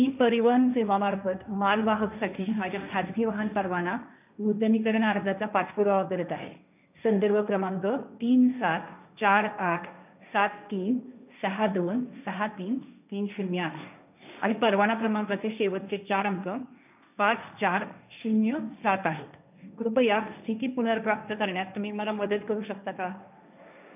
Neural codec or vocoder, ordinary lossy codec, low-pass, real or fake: codec, 16 kHz, 1.1 kbps, Voila-Tokenizer; none; 3.6 kHz; fake